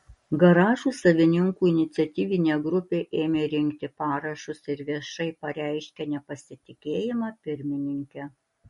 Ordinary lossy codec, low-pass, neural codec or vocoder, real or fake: MP3, 48 kbps; 19.8 kHz; none; real